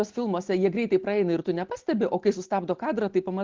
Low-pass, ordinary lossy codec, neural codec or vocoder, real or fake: 7.2 kHz; Opus, 16 kbps; none; real